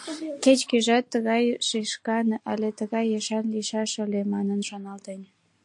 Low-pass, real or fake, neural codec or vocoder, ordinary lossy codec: 10.8 kHz; real; none; AAC, 64 kbps